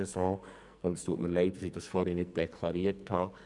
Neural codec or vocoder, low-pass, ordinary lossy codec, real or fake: codec, 44.1 kHz, 2.6 kbps, SNAC; 10.8 kHz; none; fake